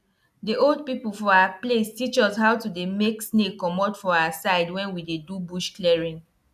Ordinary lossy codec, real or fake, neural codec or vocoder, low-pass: none; real; none; 14.4 kHz